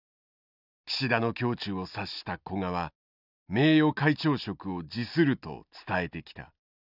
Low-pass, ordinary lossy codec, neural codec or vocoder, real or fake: 5.4 kHz; none; none; real